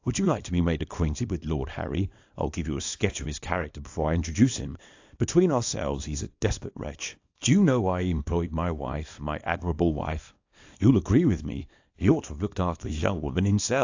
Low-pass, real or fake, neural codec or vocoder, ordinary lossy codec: 7.2 kHz; fake; codec, 24 kHz, 0.9 kbps, WavTokenizer, small release; AAC, 48 kbps